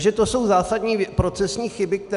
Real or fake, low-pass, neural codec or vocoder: real; 10.8 kHz; none